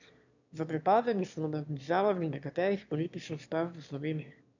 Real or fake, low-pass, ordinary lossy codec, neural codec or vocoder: fake; 7.2 kHz; none; autoencoder, 22.05 kHz, a latent of 192 numbers a frame, VITS, trained on one speaker